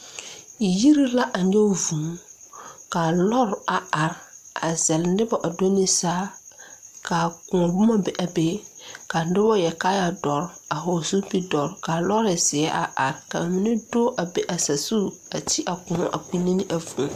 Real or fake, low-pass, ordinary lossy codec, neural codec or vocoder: real; 14.4 kHz; MP3, 96 kbps; none